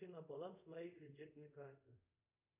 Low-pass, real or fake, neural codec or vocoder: 3.6 kHz; fake; codec, 24 kHz, 0.5 kbps, DualCodec